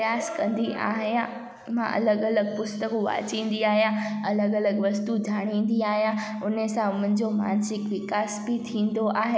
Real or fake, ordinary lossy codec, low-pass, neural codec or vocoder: real; none; none; none